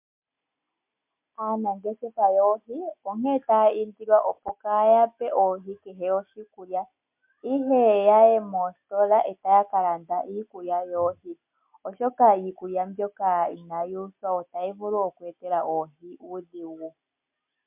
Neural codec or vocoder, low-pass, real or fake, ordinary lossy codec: none; 3.6 kHz; real; MP3, 32 kbps